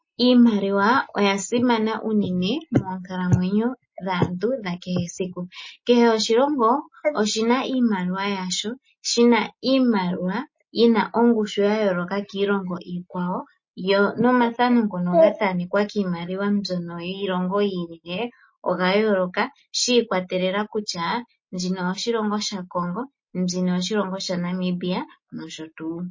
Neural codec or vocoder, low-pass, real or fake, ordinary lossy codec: none; 7.2 kHz; real; MP3, 32 kbps